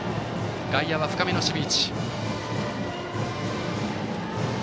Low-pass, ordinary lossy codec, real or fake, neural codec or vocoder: none; none; real; none